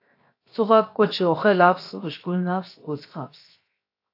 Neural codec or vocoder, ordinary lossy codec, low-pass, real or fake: codec, 16 kHz, 0.7 kbps, FocalCodec; AAC, 32 kbps; 5.4 kHz; fake